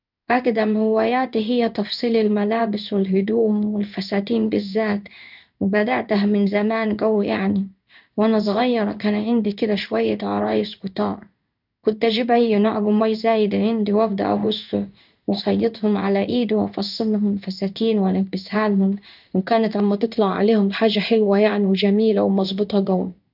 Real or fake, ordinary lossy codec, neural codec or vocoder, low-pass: fake; none; codec, 16 kHz in and 24 kHz out, 1 kbps, XY-Tokenizer; 5.4 kHz